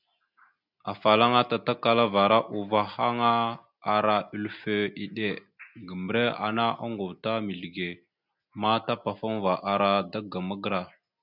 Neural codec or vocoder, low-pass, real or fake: none; 5.4 kHz; real